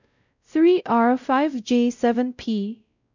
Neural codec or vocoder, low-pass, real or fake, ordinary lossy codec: codec, 16 kHz, 0.5 kbps, X-Codec, WavLM features, trained on Multilingual LibriSpeech; 7.2 kHz; fake; none